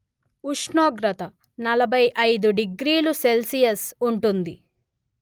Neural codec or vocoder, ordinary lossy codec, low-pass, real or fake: none; Opus, 32 kbps; 19.8 kHz; real